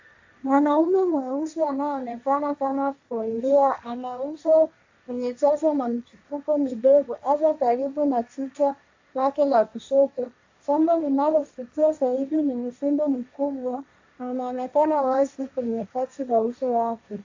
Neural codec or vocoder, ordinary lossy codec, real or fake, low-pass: codec, 16 kHz, 1.1 kbps, Voila-Tokenizer; AAC, 48 kbps; fake; 7.2 kHz